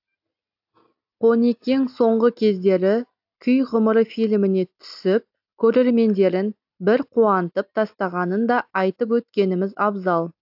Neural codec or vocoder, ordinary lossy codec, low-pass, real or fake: none; AAC, 48 kbps; 5.4 kHz; real